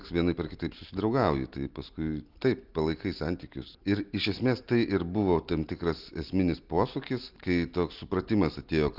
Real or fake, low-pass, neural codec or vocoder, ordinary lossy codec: real; 5.4 kHz; none; Opus, 32 kbps